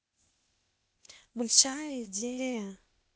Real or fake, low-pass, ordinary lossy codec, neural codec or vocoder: fake; none; none; codec, 16 kHz, 0.8 kbps, ZipCodec